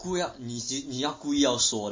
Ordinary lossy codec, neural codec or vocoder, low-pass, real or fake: MP3, 32 kbps; none; 7.2 kHz; real